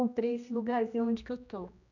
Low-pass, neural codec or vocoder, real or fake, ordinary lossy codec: 7.2 kHz; codec, 16 kHz, 1 kbps, X-Codec, HuBERT features, trained on general audio; fake; none